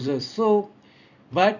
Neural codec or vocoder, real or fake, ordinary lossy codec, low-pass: vocoder, 44.1 kHz, 128 mel bands every 256 samples, BigVGAN v2; fake; none; 7.2 kHz